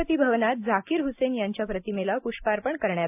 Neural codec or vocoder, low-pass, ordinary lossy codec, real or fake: none; 3.6 kHz; AAC, 32 kbps; real